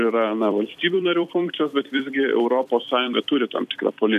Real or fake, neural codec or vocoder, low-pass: fake; vocoder, 44.1 kHz, 128 mel bands every 256 samples, BigVGAN v2; 14.4 kHz